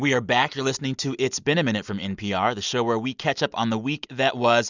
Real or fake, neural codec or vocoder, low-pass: real; none; 7.2 kHz